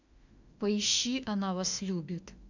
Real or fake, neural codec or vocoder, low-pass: fake; autoencoder, 48 kHz, 32 numbers a frame, DAC-VAE, trained on Japanese speech; 7.2 kHz